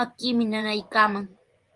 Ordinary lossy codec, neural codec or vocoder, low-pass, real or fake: Opus, 24 kbps; vocoder, 24 kHz, 100 mel bands, Vocos; 10.8 kHz; fake